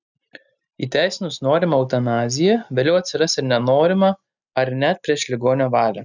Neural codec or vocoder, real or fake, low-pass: none; real; 7.2 kHz